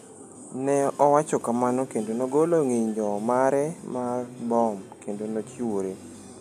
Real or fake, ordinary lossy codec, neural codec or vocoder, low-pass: real; none; none; 14.4 kHz